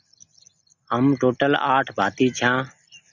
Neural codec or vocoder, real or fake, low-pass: none; real; 7.2 kHz